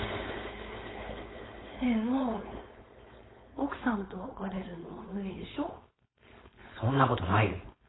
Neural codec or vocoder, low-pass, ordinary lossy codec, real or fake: codec, 16 kHz, 4.8 kbps, FACodec; 7.2 kHz; AAC, 16 kbps; fake